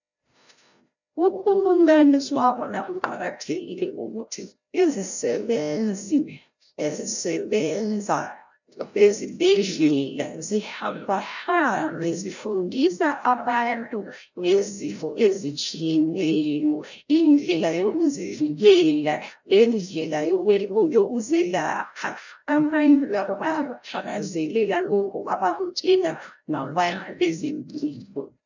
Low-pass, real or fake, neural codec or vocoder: 7.2 kHz; fake; codec, 16 kHz, 0.5 kbps, FreqCodec, larger model